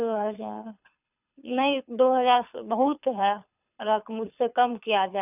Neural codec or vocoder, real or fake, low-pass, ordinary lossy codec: codec, 24 kHz, 6 kbps, HILCodec; fake; 3.6 kHz; none